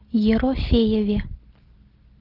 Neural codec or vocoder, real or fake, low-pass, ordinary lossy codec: none; real; 5.4 kHz; Opus, 16 kbps